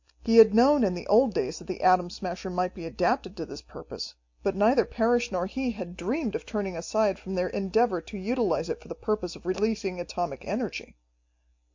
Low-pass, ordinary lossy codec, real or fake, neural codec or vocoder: 7.2 kHz; MP3, 48 kbps; real; none